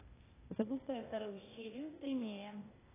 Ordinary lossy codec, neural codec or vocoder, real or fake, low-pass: AAC, 16 kbps; codec, 16 kHz, 0.8 kbps, ZipCodec; fake; 3.6 kHz